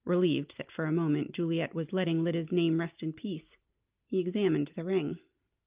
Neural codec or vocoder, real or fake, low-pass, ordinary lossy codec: none; real; 3.6 kHz; Opus, 24 kbps